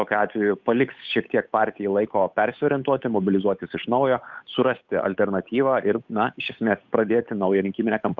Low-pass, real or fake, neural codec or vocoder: 7.2 kHz; fake; codec, 16 kHz, 8 kbps, FunCodec, trained on Chinese and English, 25 frames a second